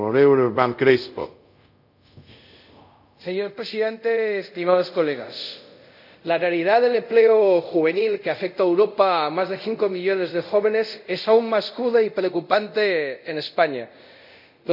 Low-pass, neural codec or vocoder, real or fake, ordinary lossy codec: 5.4 kHz; codec, 24 kHz, 0.5 kbps, DualCodec; fake; none